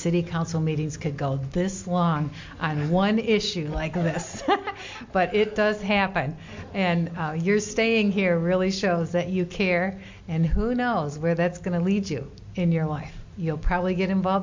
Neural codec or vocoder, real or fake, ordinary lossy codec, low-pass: none; real; MP3, 48 kbps; 7.2 kHz